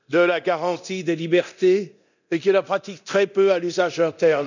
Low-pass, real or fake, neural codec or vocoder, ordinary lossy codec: 7.2 kHz; fake; codec, 24 kHz, 0.9 kbps, DualCodec; none